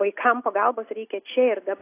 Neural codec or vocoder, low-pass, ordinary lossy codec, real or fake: none; 3.6 kHz; AAC, 24 kbps; real